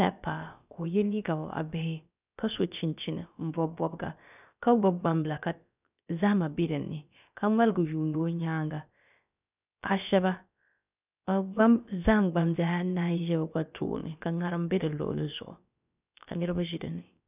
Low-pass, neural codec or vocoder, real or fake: 3.6 kHz; codec, 16 kHz, about 1 kbps, DyCAST, with the encoder's durations; fake